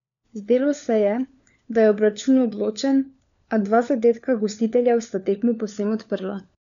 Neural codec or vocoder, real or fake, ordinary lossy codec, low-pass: codec, 16 kHz, 4 kbps, FunCodec, trained on LibriTTS, 50 frames a second; fake; none; 7.2 kHz